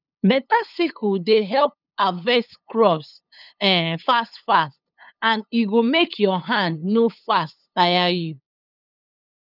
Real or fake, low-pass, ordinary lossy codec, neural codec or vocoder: fake; 5.4 kHz; none; codec, 16 kHz, 8 kbps, FunCodec, trained on LibriTTS, 25 frames a second